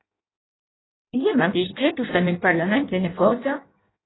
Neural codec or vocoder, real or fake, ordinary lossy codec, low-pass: codec, 16 kHz in and 24 kHz out, 0.6 kbps, FireRedTTS-2 codec; fake; AAC, 16 kbps; 7.2 kHz